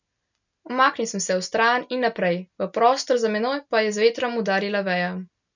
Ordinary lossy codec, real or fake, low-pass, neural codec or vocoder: none; real; 7.2 kHz; none